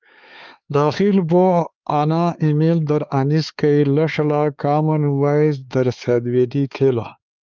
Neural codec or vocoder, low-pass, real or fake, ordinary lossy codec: codec, 16 kHz, 4 kbps, X-Codec, HuBERT features, trained on LibriSpeech; 7.2 kHz; fake; Opus, 24 kbps